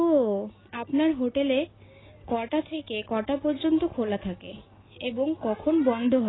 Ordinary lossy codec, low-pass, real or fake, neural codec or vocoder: AAC, 16 kbps; 7.2 kHz; real; none